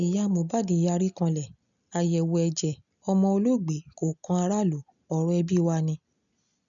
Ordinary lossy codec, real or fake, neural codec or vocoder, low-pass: none; real; none; 7.2 kHz